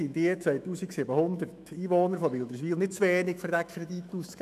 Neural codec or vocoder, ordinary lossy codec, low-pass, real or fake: autoencoder, 48 kHz, 128 numbers a frame, DAC-VAE, trained on Japanese speech; none; 14.4 kHz; fake